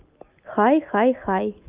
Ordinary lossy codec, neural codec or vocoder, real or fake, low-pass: Opus, 24 kbps; none; real; 3.6 kHz